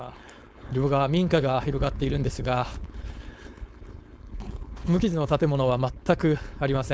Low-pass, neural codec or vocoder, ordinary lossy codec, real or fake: none; codec, 16 kHz, 4.8 kbps, FACodec; none; fake